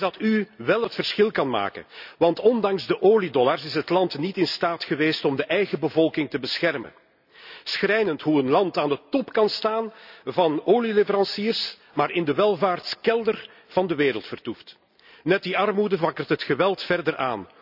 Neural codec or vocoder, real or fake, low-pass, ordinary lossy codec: none; real; 5.4 kHz; none